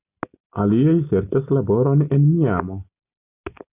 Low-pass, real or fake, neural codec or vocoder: 3.6 kHz; real; none